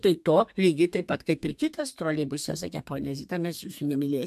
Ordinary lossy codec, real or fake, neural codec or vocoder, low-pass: MP3, 96 kbps; fake; codec, 32 kHz, 1.9 kbps, SNAC; 14.4 kHz